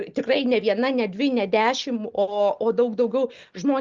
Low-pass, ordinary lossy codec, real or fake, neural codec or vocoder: 7.2 kHz; Opus, 32 kbps; real; none